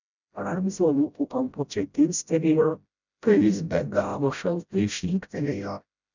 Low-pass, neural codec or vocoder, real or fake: 7.2 kHz; codec, 16 kHz, 0.5 kbps, FreqCodec, smaller model; fake